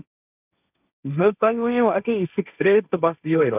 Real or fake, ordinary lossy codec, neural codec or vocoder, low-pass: fake; none; codec, 16 kHz, 1.1 kbps, Voila-Tokenizer; 3.6 kHz